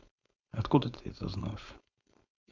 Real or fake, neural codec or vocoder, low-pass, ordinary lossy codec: fake; codec, 16 kHz, 4.8 kbps, FACodec; 7.2 kHz; none